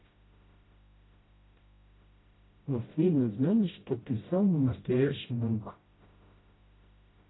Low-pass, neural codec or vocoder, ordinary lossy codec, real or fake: 7.2 kHz; codec, 16 kHz, 0.5 kbps, FreqCodec, smaller model; AAC, 16 kbps; fake